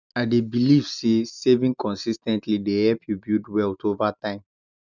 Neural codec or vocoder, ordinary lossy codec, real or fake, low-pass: none; none; real; 7.2 kHz